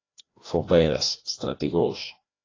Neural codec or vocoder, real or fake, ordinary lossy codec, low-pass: codec, 16 kHz, 1 kbps, FreqCodec, larger model; fake; AAC, 32 kbps; 7.2 kHz